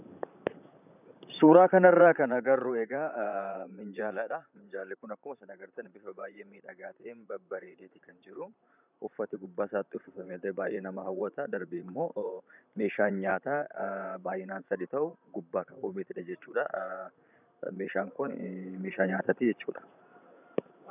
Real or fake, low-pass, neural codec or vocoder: fake; 3.6 kHz; vocoder, 44.1 kHz, 128 mel bands, Pupu-Vocoder